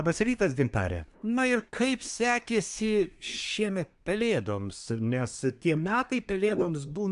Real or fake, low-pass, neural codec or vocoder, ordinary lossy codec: fake; 10.8 kHz; codec, 24 kHz, 1 kbps, SNAC; AAC, 96 kbps